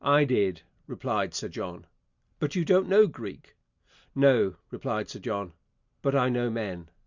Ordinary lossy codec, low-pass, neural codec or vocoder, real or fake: Opus, 64 kbps; 7.2 kHz; none; real